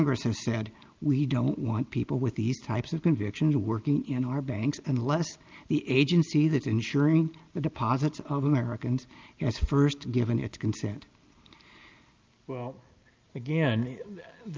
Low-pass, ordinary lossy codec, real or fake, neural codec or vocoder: 7.2 kHz; Opus, 24 kbps; real; none